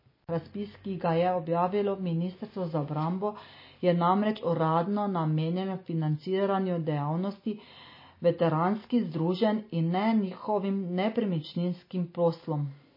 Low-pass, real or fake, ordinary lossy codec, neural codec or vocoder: 5.4 kHz; real; MP3, 24 kbps; none